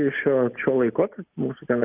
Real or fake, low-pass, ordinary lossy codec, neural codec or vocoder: real; 3.6 kHz; Opus, 24 kbps; none